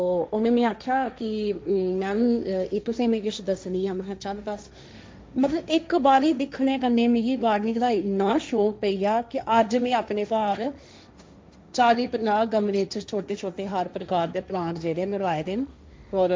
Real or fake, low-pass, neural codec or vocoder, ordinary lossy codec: fake; none; codec, 16 kHz, 1.1 kbps, Voila-Tokenizer; none